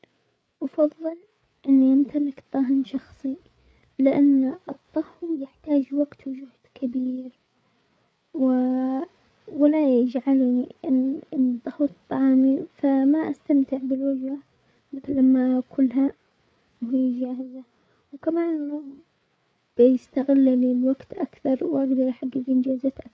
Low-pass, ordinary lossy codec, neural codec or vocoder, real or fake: none; none; codec, 16 kHz, 8 kbps, FreqCodec, larger model; fake